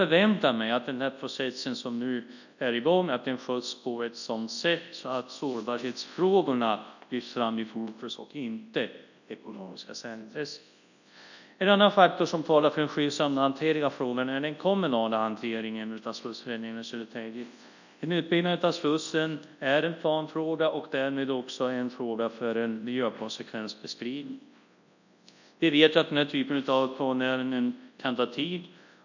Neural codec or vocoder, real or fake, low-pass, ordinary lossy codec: codec, 24 kHz, 0.9 kbps, WavTokenizer, large speech release; fake; 7.2 kHz; none